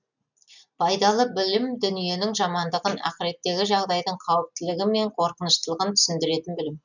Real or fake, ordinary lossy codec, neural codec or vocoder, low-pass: real; none; none; 7.2 kHz